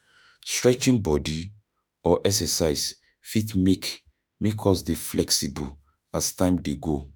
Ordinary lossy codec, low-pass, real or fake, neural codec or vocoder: none; none; fake; autoencoder, 48 kHz, 32 numbers a frame, DAC-VAE, trained on Japanese speech